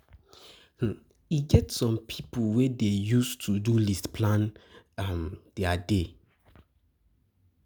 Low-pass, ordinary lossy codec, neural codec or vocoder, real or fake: none; none; none; real